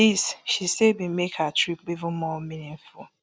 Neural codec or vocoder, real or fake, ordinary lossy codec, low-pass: none; real; none; none